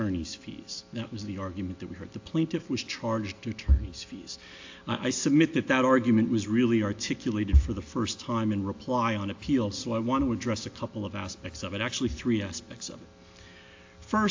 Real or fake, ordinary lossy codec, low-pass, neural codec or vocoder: real; AAC, 48 kbps; 7.2 kHz; none